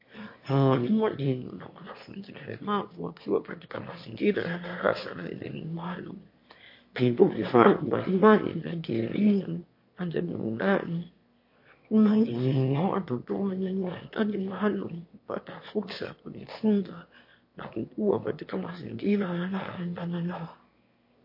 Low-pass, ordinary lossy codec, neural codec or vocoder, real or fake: 5.4 kHz; MP3, 32 kbps; autoencoder, 22.05 kHz, a latent of 192 numbers a frame, VITS, trained on one speaker; fake